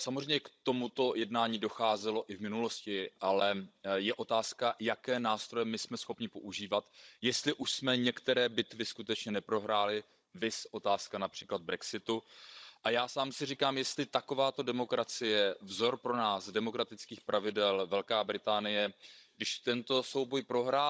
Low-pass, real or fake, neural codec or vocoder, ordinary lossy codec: none; fake; codec, 16 kHz, 16 kbps, FunCodec, trained on Chinese and English, 50 frames a second; none